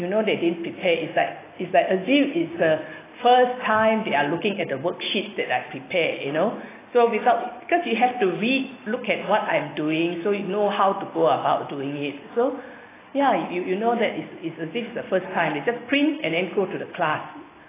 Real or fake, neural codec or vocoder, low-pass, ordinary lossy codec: real; none; 3.6 kHz; AAC, 16 kbps